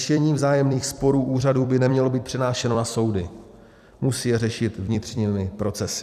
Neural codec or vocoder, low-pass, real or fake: vocoder, 44.1 kHz, 128 mel bands every 256 samples, BigVGAN v2; 14.4 kHz; fake